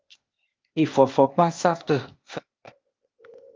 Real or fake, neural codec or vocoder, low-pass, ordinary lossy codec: fake; codec, 16 kHz, 0.8 kbps, ZipCodec; 7.2 kHz; Opus, 32 kbps